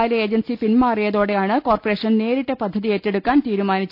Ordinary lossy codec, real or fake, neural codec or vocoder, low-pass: none; real; none; 5.4 kHz